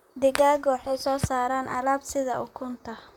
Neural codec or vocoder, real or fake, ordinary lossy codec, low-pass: vocoder, 44.1 kHz, 128 mel bands, Pupu-Vocoder; fake; none; 19.8 kHz